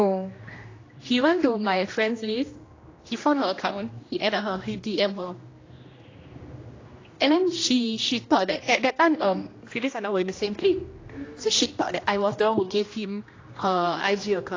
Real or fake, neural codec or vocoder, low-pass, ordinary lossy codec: fake; codec, 16 kHz, 1 kbps, X-Codec, HuBERT features, trained on general audio; 7.2 kHz; AAC, 32 kbps